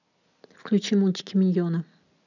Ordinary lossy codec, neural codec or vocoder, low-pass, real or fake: none; none; 7.2 kHz; real